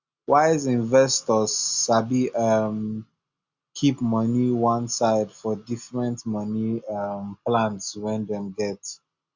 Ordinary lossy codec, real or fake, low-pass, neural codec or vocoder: Opus, 64 kbps; real; 7.2 kHz; none